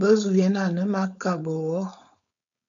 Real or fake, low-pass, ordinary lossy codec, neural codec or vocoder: fake; 7.2 kHz; MP3, 96 kbps; codec, 16 kHz, 4.8 kbps, FACodec